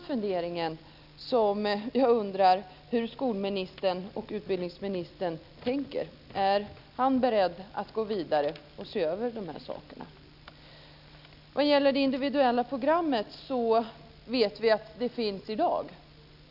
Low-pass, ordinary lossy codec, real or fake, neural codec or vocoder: 5.4 kHz; none; real; none